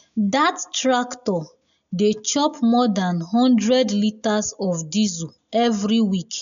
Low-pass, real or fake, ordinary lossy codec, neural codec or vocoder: 7.2 kHz; real; none; none